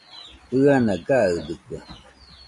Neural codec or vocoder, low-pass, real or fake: none; 10.8 kHz; real